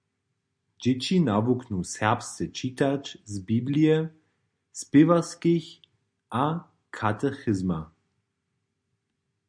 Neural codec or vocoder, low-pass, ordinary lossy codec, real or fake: none; 9.9 kHz; MP3, 64 kbps; real